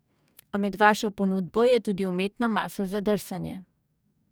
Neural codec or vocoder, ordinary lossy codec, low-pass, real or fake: codec, 44.1 kHz, 2.6 kbps, DAC; none; none; fake